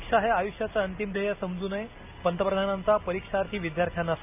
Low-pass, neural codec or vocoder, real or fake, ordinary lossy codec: 3.6 kHz; none; real; AAC, 32 kbps